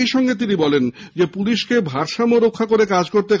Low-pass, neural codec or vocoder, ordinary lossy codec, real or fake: none; none; none; real